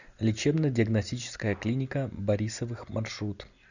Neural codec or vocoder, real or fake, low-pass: none; real; 7.2 kHz